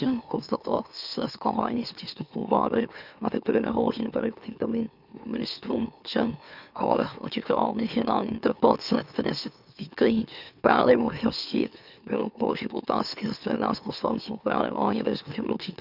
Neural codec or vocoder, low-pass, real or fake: autoencoder, 44.1 kHz, a latent of 192 numbers a frame, MeloTTS; 5.4 kHz; fake